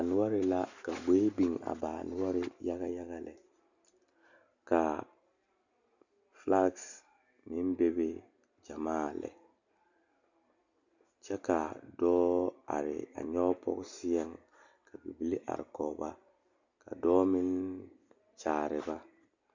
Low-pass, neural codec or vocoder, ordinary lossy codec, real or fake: 7.2 kHz; none; Opus, 64 kbps; real